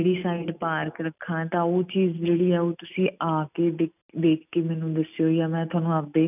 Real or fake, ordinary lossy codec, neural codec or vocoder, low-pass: real; none; none; 3.6 kHz